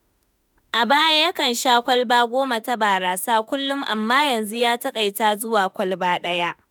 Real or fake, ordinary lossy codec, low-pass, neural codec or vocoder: fake; none; none; autoencoder, 48 kHz, 32 numbers a frame, DAC-VAE, trained on Japanese speech